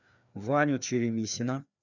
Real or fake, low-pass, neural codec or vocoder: fake; 7.2 kHz; codec, 16 kHz, 2 kbps, FreqCodec, larger model